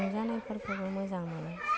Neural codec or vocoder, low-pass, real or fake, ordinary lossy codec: none; none; real; none